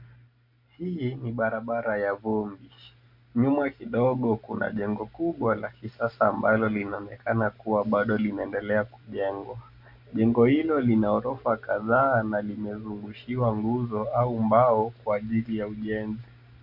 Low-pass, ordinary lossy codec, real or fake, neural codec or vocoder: 5.4 kHz; MP3, 48 kbps; real; none